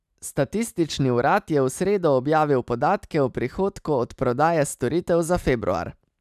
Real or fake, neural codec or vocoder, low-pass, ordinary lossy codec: real; none; 14.4 kHz; none